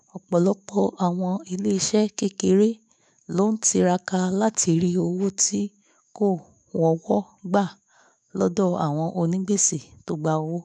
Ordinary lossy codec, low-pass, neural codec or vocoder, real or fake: none; 10.8 kHz; autoencoder, 48 kHz, 128 numbers a frame, DAC-VAE, trained on Japanese speech; fake